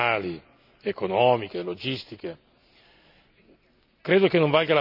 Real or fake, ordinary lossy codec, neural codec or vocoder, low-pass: real; none; none; 5.4 kHz